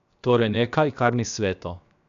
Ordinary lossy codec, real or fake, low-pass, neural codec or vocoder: MP3, 96 kbps; fake; 7.2 kHz; codec, 16 kHz, 0.7 kbps, FocalCodec